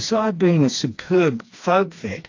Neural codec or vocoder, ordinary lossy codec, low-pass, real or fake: codec, 16 kHz, 2 kbps, FreqCodec, smaller model; AAC, 48 kbps; 7.2 kHz; fake